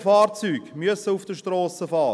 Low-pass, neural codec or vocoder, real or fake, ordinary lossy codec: none; none; real; none